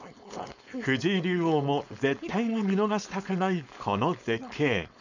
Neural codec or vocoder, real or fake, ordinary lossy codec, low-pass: codec, 16 kHz, 4.8 kbps, FACodec; fake; none; 7.2 kHz